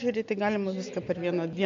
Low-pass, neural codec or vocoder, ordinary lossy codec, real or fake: 7.2 kHz; none; MP3, 48 kbps; real